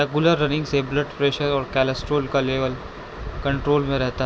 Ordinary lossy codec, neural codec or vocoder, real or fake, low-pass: none; none; real; none